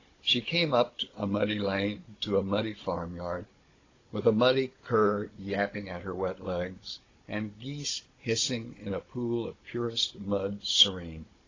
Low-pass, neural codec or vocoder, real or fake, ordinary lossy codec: 7.2 kHz; codec, 16 kHz, 16 kbps, FunCodec, trained on Chinese and English, 50 frames a second; fake; AAC, 32 kbps